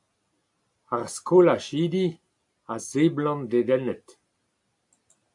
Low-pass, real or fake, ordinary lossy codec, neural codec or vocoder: 10.8 kHz; real; AAC, 64 kbps; none